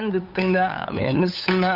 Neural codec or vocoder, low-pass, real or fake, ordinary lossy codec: codec, 16 kHz, 8 kbps, FunCodec, trained on LibriTTS, 25 frames a second; 5.4 kHz; fake; none